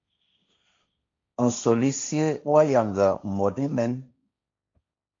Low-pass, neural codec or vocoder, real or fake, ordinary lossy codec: 7.2 kHz; codec, 16 kHz, 1.1 kbps, Voila-Tokenizer; fake; MP3, 48 kbps